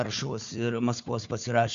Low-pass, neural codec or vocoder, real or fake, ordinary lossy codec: 7.2 kHz; codec, 16 kHz, 4 kbps, FunCodec, trained on Chinese and English, 50 frames a second; fake; MP3, 64 kbps